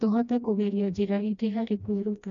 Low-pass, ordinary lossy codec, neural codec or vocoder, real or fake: 7.2 kHz; none; codec, 16 kHz, 1 kbps, FreqCodec, smaller model; fake